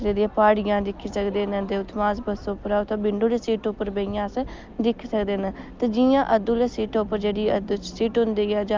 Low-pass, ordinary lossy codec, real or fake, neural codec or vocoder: 7.2 kHz; Opus, 24 kbps; real; none